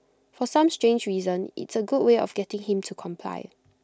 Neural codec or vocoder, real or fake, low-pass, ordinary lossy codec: none; real; none; none